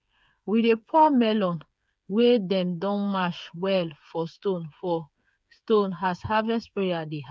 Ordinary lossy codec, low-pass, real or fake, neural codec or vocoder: none; none; fake; codec, 16 kHz, 8 kbps, FreqCodec, smaller model